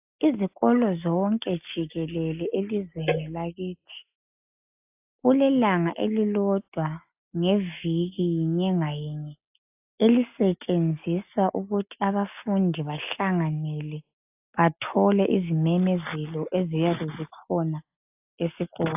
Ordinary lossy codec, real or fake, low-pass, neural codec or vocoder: AAC, 32 kbps; real; 3.6 kHz; none